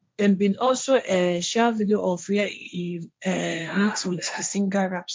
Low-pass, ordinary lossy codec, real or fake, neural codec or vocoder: none; none; fake; codec, 16 kHz, 1.1 kbps, Voila-Tokenizer